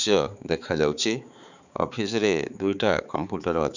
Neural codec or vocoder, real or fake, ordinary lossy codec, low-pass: codec, 16 kHz, 4 kbps, X-Codec, HuBERT features, trained on balanced general audio; fake; none; 7.2 kHz